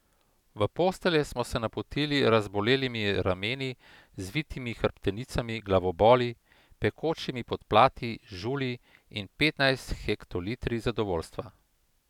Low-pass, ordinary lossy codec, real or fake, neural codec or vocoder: 19.8 kHz; none; real; none